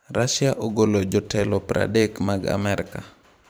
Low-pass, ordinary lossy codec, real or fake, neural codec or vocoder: none; none; fake; vocoder, 44.1 kHz, 128 mel bands every 512 samples, BigVGAN v2